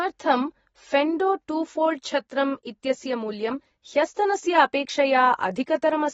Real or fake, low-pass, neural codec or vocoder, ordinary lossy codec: real; 19.8 kHz; none; AAC, 24 kbps